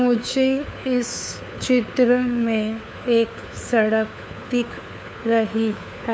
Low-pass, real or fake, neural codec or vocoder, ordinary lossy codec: none; fake; codec, 16 kHz, 4 kbps, FunCodec, trained on LibriTTS, 50 frames a second; none